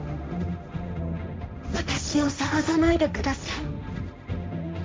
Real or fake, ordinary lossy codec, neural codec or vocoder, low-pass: fake; none; codec, 16 kHz, 1.1 kbps, Voila-Tokenizer; none